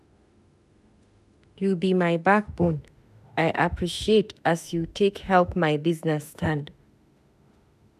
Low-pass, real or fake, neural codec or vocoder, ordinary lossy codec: 14.4 kHz; fake; autoencoder, 48 kHz, 32 numbers a frame, DAC-VAE, trained on Japanese speech; none